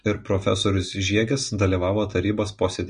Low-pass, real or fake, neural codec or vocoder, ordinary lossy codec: 9.9 kHz; real; none; MP3, 48 kbps